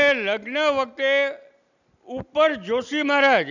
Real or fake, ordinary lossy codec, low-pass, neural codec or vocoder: real; none; 7.2 kHz; none